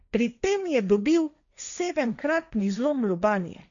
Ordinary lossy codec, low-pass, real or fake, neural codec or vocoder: none; 7.2 kHz; fake; codec, 16 kHz, 1.1 kbps, Voila-Tokenizer